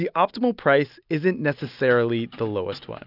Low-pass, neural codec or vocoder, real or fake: 5.4 kHz; none; real